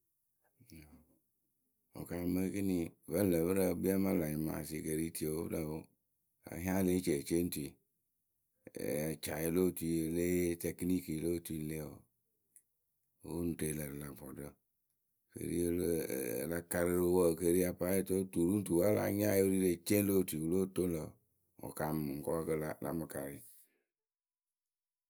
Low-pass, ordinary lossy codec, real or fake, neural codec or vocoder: none; none; real; none